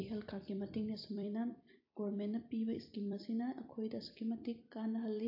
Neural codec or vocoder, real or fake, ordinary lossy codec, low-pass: vocoder, 44.1 kHz, 128 mel bands every 256 samples, BigVGAN v2; fake; AAC, 32 kbps; 5.4 kHz